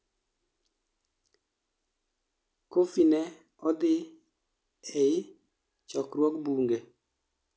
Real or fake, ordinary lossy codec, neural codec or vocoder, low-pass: real; none; none; none